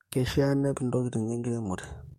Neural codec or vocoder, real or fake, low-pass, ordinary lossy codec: codec, 44.1 kHz, 7.8 kbps, DAC; fake; 19.8 kHz; MP3, 64 kbps